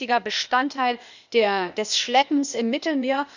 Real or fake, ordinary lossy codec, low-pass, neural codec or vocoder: fake; none; 7.2 kHz; codec, 16 kHz, 0.8 kbps, ZipCodec